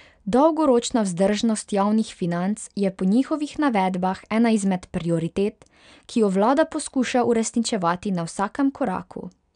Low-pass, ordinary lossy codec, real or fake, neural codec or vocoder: 9.9 kHz; none; real; none